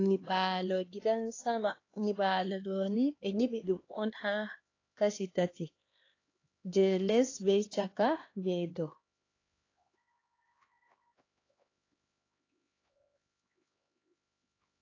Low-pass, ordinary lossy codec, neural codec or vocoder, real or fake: 7.2 kHz; AAC, 32 kbps; codec, 16 kHz, 2 kbps, X-Codec, HuBERT features, trained on LibriSpeech; fake